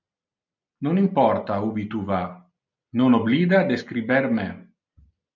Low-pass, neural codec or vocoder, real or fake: 7.2 kHz; none; real